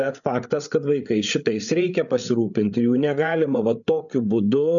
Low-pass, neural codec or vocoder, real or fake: 7.2 kHz; codec, 16 kHz, 8 kbps, FreqCodec, larger model; fake